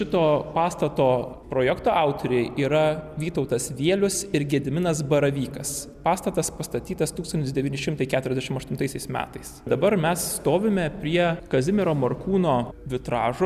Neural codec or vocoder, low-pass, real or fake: none; 14.4 kHz; real